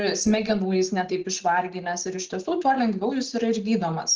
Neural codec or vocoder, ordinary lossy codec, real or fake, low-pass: none; Opus, 16 kbps; real; 7.2 kHz